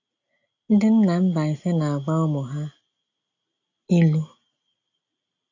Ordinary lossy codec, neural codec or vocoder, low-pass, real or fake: AAC, 48 kbps; none; 7.2 kHz; real